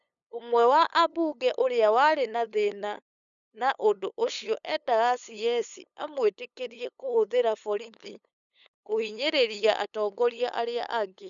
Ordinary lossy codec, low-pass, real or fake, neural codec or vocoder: none; 7.2 kHz; fake; codec, 16 kHz, 8 kbps, FunCodec, trained on LibriTTS, 25 frames a second